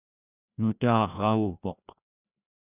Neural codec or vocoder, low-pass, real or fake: codec, 16 kHz, 1 kbps, FreqCodec, larger model; 3.6 kHz; fake